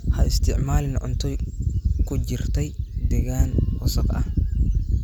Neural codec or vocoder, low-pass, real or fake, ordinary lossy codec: none; 19.8 kHz; real; none